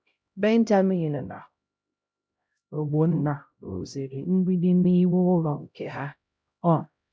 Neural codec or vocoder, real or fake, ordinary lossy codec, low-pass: codec, 16 kHz, 0.5 kbps, X-Codec, HuBERT features, trained on LibriSpeech; fake; none; none